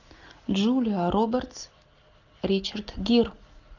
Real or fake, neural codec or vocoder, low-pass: real; none; 7.2 kHz